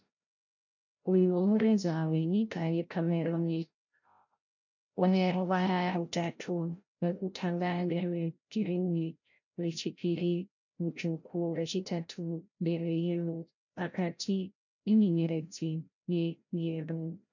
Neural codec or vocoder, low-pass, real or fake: codec, 16 kHz, 0.5 kbps, FreqCodec, larger model; 7.2 kHz; fake